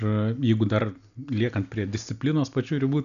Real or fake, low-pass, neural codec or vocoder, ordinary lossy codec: real; 7.2 kHz; none; MP3, 96 kbps